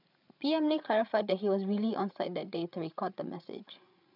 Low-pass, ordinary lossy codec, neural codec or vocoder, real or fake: 5.4 kHz; none; codec, 16 kHz, 8 kbps, FreqCodec, larger model; fake